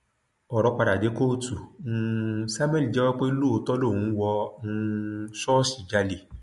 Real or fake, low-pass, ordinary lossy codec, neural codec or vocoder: real; 14.4 kHz; MP3, 48 kbps; none